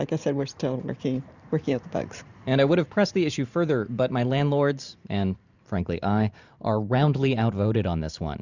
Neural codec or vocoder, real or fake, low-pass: vocoder, 44.1 kHz, 128 mel bands every 512 samples, BigVGAN v2; fake; 7.2 kHz